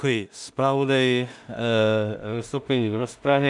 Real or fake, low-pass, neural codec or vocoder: fake; 10.8 kHz; codec, 16 kHz in and 24 kHz out, 0.4 kbps, LongCat-Audio-Codec, two codebook decoder